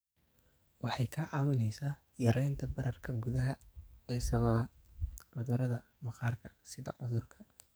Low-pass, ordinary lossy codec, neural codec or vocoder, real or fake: none; none; codec, 44.1 kHz, 2.6 kbps, SNAC; fake